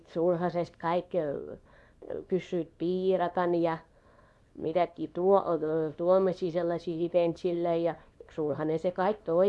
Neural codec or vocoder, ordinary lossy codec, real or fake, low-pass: codec, 24 kHz, 0.9 kbps, WavTokenizer, small release; none; fake; none